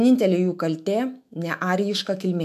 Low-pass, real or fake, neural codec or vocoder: 14.4 kHz; fake; autoencoder, 48 kHz, 128 numbers a frame, DAC-VAE, trained on Japanese speech